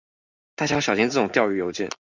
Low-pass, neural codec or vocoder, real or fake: 7.2 kHz; none; real